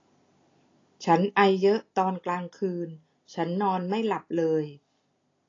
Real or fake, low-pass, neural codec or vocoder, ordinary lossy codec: real; 7.2 kHz; none; AAC, 32 kbps